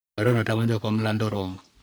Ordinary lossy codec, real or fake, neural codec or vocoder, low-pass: none; fake; codec, 44.1 kHz, 3.4 kbps, Pupu-Codec; none